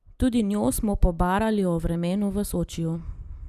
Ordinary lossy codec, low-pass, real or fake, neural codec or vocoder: Opus, 64 kbps; 14.4 kHz; real; none